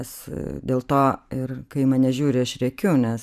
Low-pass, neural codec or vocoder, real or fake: 14.4 kHz; vocoder, 44.1 kHz, 128 mel bands every 512 samples, BigVGAN v2; fake